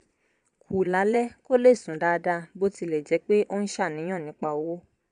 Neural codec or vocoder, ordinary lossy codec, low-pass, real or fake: vocoder, 22.05 kHz, 80 mel bands, Vocos; none; 9.9 kHz; fake